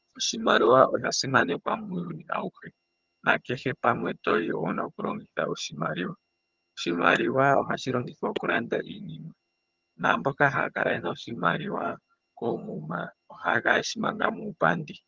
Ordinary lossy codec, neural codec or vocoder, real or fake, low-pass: Opus, 32 kbps; vocoder, 22.05 kHz, 80 mel bands, HiFi-GAN; fake; 7.2 kHz